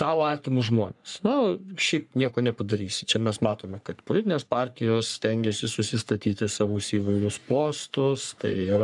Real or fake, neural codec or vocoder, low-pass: fake; codec, 44.1 kHz, 3.4 kbps, Pupu-Codec; 10.8 kHz